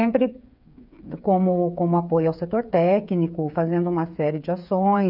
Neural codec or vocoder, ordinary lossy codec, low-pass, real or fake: codec, 16 kHz, 8 kbps, FreqCodec, smaller model; none; 5.4 kHz; fake